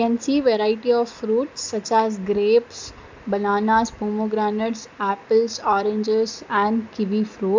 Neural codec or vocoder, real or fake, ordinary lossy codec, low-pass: none; real; MP3, 64 kbps; 7.2 kHz